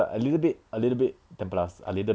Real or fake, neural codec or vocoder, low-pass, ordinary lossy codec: real; none; none; none